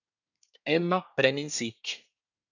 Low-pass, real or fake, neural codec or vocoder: 7.2 kHz; fake; codec, 24 kHz, 1 kbps, SNAC